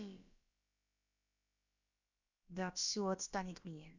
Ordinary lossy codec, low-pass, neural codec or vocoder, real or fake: none; 7.2 kHz; codec, 16 kHz, about 1 kbps, DyCAST, with the encoder's durations; fake